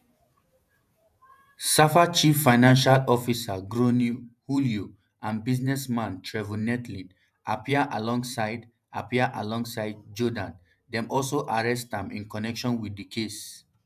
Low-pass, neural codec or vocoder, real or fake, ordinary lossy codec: 14.4 kHz; vocoder, 48 kHz, 128 mel bands, Vocos; fake; none